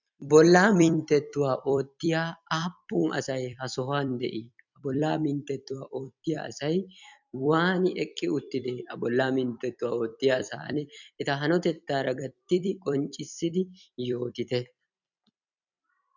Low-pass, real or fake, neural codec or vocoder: 7.2 kHz; fake; vocoder, 44.1 kHz, 128 mel bands every 256 samples, BigVGAN v2